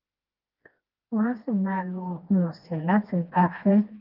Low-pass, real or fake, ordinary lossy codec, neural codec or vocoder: 5.4 kHz; fake; Opus, 32 kbps; codec, 16 kHz, 2 kbps, FreqCodec, smaller model